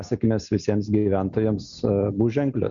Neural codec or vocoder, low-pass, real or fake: none; 7.2 kHz; real